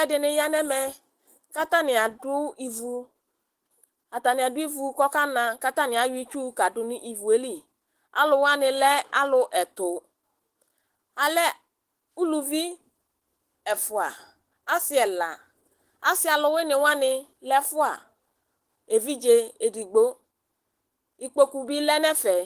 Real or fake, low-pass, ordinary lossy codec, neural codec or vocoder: fake; 14.4 kHz; Opus, 16 kbps; autoencoder, 48 kHz, 128 numbers a frame, DAC-VAE, trained on Japanese speech